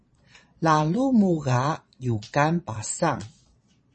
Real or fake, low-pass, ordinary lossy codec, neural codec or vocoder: fake; 9.9 kHz; MP3, 32 kbps; vocoder, 22.05 kHz, 80 mel bands, Vocos